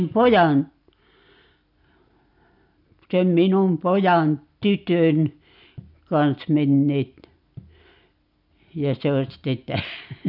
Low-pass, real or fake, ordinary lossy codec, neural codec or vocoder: 5.4 kHz; real; none; none